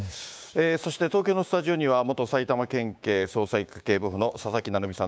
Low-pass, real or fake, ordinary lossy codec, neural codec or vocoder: none; fake; none; codec, 16 kHz, 6 kbps, DAC